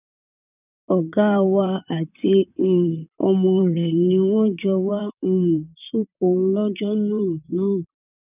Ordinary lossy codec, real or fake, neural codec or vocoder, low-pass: none; fake; vocoder, 44.1 kHz, 128 mel bands, Pupu-Vocoder; 3.6 kHz